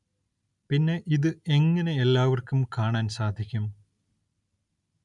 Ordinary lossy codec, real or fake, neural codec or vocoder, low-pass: none; real; none; 10.8 kHz